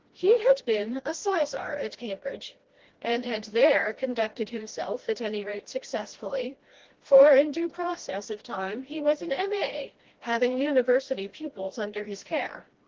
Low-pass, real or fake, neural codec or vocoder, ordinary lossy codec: 7.2 kHz; fake; codec, 16 kHz, 1 kbps, FreqCodec, smaller model; Opus, 16 kbps